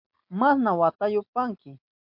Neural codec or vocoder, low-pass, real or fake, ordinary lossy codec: none; 5.4 kHz; real; MP3, 48 kbps